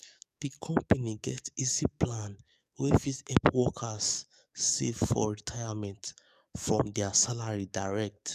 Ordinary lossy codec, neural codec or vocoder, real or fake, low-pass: none; codec, 44.1 kHz, 7.8 kbps, DAC; fake; 14.4 kHz